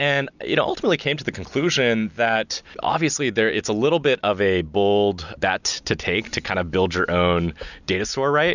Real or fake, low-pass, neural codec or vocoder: real; 7.2 kHz; none